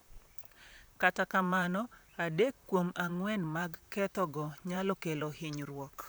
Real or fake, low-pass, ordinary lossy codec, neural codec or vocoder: fake; none; none; vocoder, 44.1 kHz, 128 mel bands, Pupu-Vocoder